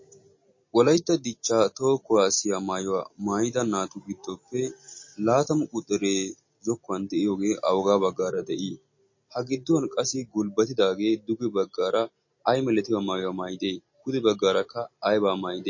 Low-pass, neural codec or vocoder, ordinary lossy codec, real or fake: 7.2 kHz; none; MP3, 32 kbps; real